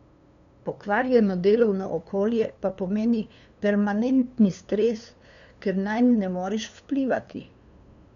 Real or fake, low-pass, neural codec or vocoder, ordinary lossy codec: fake; 7.2 kHz; codec, 16 kHz, 2 kbps, FunCodec, trained on LibriTTS, 25 frames a second; MP3, 96 kbps